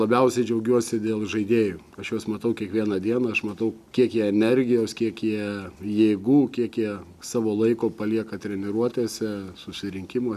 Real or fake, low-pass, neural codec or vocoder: real; 14.4 kHz; none